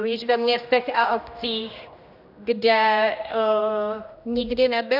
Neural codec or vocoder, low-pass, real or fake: codec, 16 kHz, 1 kbps, X-Codec, HuBERT features, trained on general audio; 5.4 kHz; fake